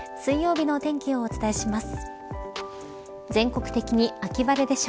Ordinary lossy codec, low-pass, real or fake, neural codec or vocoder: none; none; real; none